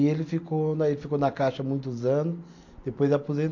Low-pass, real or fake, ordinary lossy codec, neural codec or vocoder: 7.2 kHz; real; MP3, 48 kbps; none